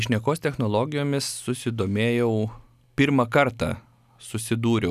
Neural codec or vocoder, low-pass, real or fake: none; 14.4 kHz; real